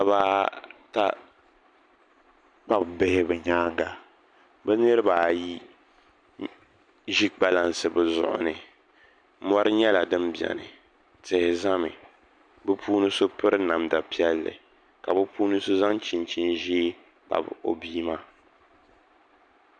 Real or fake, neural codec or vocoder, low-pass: real; none; 9.9 kHz